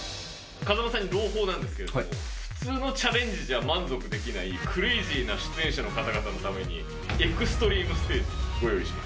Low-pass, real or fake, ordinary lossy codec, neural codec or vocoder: none; real; none; none